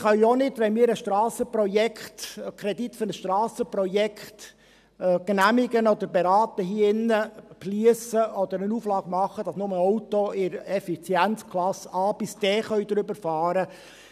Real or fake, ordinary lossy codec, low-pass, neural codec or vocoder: real; none; 14.4 kHz; none